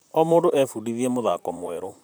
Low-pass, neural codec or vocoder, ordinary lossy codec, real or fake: none; vocoder, 44.1 kHz, 128 mel bands, Pupu-Vocoder; none; fake